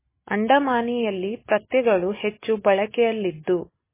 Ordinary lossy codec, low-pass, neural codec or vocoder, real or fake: MP3, 16 kbps; 3.6 kHz; none; real